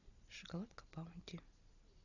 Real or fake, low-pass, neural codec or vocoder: real; 7.2 kHz; none